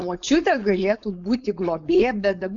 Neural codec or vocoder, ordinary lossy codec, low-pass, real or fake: codec, 16 kHz, 4.8 kbps, FACodec; AAC, 64 kbps; 7.2 kHz; fake